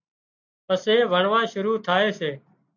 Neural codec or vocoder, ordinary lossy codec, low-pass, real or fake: none; AAC, 48 kbps; 7.2 kHz; real